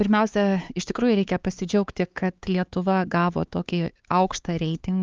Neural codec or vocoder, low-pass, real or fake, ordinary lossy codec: codec, 16 kHz, 4 kbps, X-Codec, HuBERT features, trained on LibriSpeech; 7.2 kHz; fake; Opus, 24 kbps